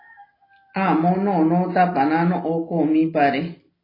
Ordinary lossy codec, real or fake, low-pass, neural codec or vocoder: AAC, 24 kbps; real; 5.4 kHz; none